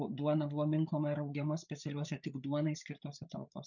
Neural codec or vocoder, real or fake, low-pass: codec, 16 kHz, 8 kbps, FreqCodec, larger model; fake; 7.2 kHz